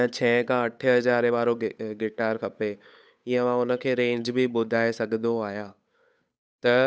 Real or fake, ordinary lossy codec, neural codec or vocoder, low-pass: fake; none; codec, 16 kHz, 8 kbps, FunCodec, trained on Chinese and English, 25 frames a second; none